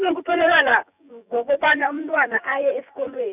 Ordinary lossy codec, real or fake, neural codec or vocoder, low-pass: none; fake; vocoder, 24 kHz, 100 mel bands, Vocos; 3.6 kHz